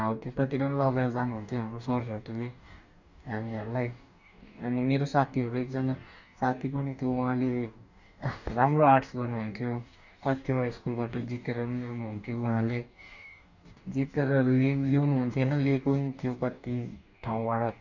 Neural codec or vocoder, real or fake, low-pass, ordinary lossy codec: codec, 44.1 kHz, 2.6 kbps, DAC; fake; 7.2 kHz; none